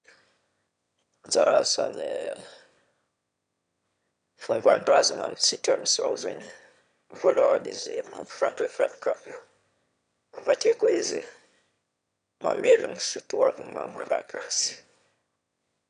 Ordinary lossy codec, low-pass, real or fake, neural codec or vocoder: none; 9.9 kHz; fake; autoencoder, 22.05 kHz, a latent of 192 numbers a frame, VITS, trained on one speaker